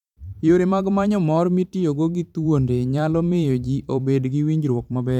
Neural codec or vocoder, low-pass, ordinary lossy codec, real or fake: vocoder, 44.1 kHz, 128 mel bands every 512 samples, BigVGAN v2; 19.8 kHz; none; fake